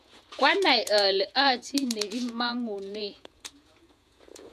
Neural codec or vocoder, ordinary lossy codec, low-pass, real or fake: vocoder, 48 kHz, 128 mel bands, Vocos; none; 14.4 kHz; fake